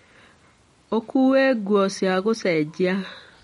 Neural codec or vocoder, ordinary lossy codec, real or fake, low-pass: none; MP3, 48 kbps; real; 19.8 kHz